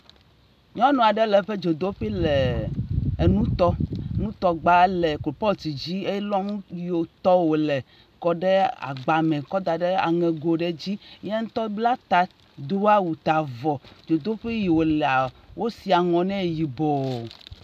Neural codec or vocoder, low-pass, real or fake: none; 14.4 kHz; real